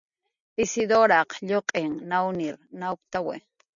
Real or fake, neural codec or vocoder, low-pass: real; none; 7.2 kHz